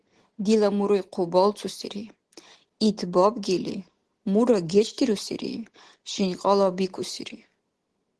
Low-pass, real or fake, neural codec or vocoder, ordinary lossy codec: 10.8 kHz; real; none; Opus, 16 kbps